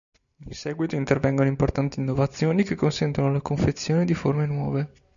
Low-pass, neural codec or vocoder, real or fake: 7.2 kHz; none; real